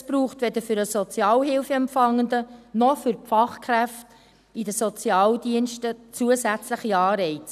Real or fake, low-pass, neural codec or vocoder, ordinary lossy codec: real; 14.4 kHz; none; none